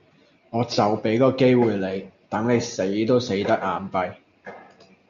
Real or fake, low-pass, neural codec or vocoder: real; 7.2 kHz; none